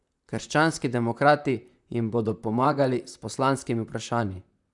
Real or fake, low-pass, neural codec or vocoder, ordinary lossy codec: fake; 10.8 kHz; vocoder, 44.1 kHz, 128 mel bands, Pupu-Vocoder; none